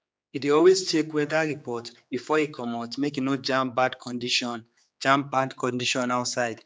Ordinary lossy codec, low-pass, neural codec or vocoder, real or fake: none; none; codec, 16 kHz, 4 kbps, X-Codec, HuBERT features, trained on general audio; fake